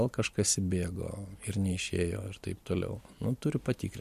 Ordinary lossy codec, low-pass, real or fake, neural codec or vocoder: MP3, 64 kbps; 14.4 kHz; real; none